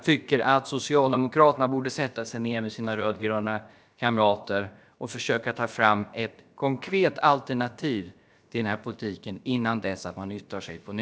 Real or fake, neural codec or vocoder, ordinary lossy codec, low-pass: fake; codec, 16 kHz, about 1 kbps, DyCAST, with the encoder's durations; none; none